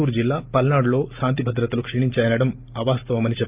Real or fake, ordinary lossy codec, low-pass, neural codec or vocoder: real; Opus, 24 kbps; 3.6 kHz; none